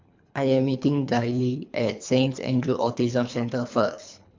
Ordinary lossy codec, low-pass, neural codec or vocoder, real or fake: MP3, 64 kbps; 7.2 kHz; codec, 24 kHz, 3 kbps, HILCodec; fake